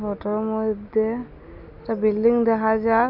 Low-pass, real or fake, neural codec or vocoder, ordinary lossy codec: 5.4 kHz; real; none; AAC, 48 kbps